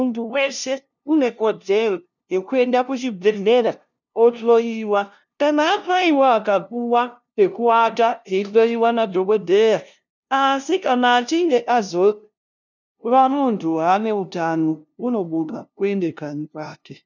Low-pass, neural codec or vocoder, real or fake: 7.2 kHz; codec, 16 kHz, 0.5 kbps, FunCodec, trained on LibriTTS, 25 frames a second; fake